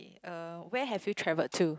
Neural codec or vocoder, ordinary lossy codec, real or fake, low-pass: none; none; real; none